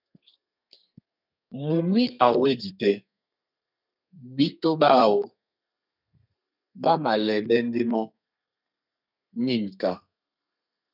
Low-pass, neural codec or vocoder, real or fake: 5.4 kHz; codec, 32 kHz, 1.9 kbps, SNAC; fake